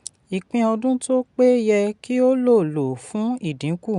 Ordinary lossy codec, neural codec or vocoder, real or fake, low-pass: none; none; real; 10.8 kHz